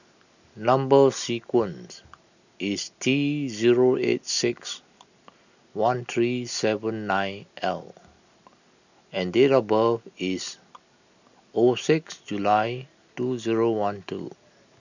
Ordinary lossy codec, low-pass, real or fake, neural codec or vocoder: none; 7.2 kHz; real; none